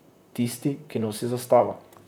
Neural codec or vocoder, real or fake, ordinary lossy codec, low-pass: vocoder, 44.1 kHz, 128 mel bands, Pupu-Vocoder; fake; none; none